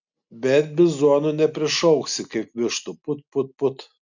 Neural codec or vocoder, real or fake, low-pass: none; real; 7.2 kHz